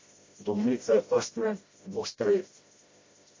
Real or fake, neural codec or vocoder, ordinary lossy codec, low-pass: fake; codec, 16 kHz, 0.5 kbps, FreqCodec, smaller model; MP3, 32 kbps; 7.2 kHz